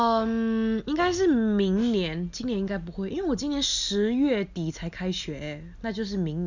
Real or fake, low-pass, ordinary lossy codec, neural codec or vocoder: real; 7.2 kHz; none; none